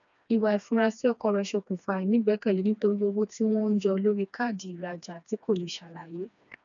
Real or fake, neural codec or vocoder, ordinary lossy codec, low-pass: fake; codec, 16 kHz, 2 kbps, FreqCodec, smaller model; none; 7.2 kHz